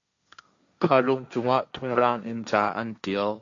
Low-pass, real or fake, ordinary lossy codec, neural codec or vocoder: 7.2 kHz; fake; AAC, 64 kbps; codec, 16 kHz, 1.1 kbps, Voila-Tokenizer